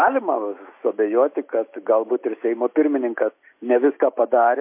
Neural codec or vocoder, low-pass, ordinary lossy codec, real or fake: none; 3.6 kHz; MP3, 24 kbps; real